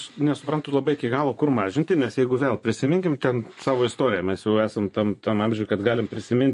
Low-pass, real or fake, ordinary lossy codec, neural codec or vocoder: 10.8 kHz; fake; MP3, 48 kbps; vocoder, 24 kHz, 100 mel bands, Vocos